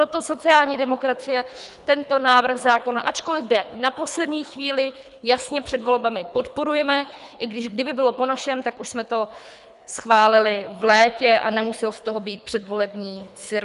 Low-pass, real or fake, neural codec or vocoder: 10.8 kHz; fake; codec, 24 kHz, 3 kbps, HILCodec